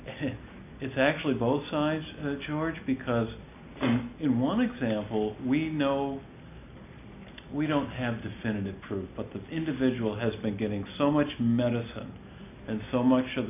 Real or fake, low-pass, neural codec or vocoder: real; 3.6 kHz; none